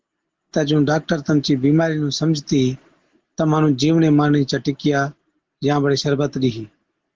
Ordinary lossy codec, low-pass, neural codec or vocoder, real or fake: Opus, 16 kbps; 7.2 kHz; none; real